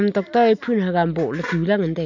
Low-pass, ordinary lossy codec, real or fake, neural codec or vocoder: 7.2 kHz; none; real; none